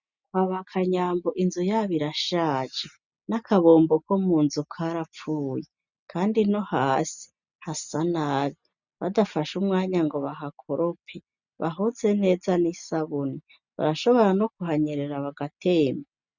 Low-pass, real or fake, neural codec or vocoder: 7.2 kHz; real; none